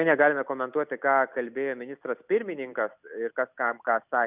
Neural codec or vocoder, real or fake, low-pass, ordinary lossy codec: none; real; 3.6 kHz; Opus, 64 kbps